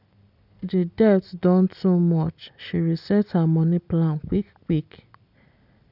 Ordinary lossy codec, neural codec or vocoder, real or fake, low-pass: none; none; real; 5.4 kHz